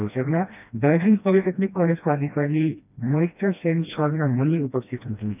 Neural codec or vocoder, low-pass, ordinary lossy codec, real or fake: codec, 16 kHz, 1 kbps, FreqCodec, smaller model; 3.6 kHz; none; fake